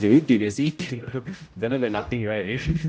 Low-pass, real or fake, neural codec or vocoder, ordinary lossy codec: none; fake; codec, 16 kHz, 0.5 kbps, X-Codec, HuBERT features, trained on general audio; none